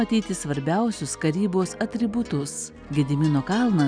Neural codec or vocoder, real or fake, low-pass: none; real; 9.9 kHz